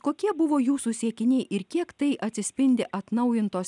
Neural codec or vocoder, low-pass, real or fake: none; 10.8 kHz; real